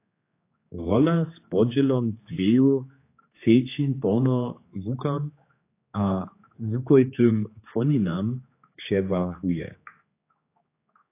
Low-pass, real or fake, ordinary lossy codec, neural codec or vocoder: 3.6 kHz; fake; AAC, 24 kbps; codec, 16 kHz, 4 kbps, X-Codec, HuBERT features, trained on general audio